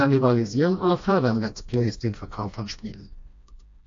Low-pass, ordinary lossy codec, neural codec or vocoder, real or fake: 7.2 kHz; AAC, 48 kbps; codec, 16 kHz, 1 kbps, FreqCodec, smaller model; fake